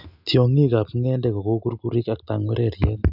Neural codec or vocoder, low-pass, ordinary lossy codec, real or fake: none; 5.4 kHz; none; real